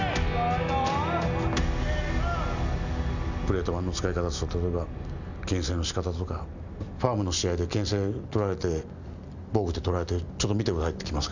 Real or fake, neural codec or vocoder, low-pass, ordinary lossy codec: real; none; 7.2 kHz; none